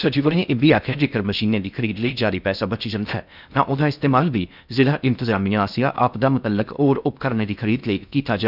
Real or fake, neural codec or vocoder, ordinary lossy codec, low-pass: fake; codec, 16 kHz in and 24 kHz out, 0.6 kbps, FocalCodec, streaming, 4096 codes; none; 5.4 kHz